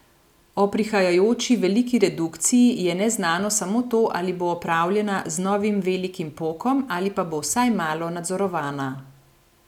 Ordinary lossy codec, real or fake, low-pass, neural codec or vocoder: none; real; 19.8 kHz; none